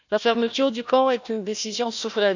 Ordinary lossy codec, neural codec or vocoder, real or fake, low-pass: none; codec, 16 kHz, 1 kbps, FunCodec, trained on Chinese and English, 50 frames a second; fake; 7.2 kHz